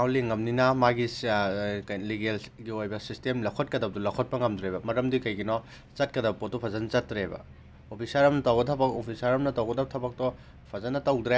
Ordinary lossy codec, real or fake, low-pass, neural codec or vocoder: none; real; none; none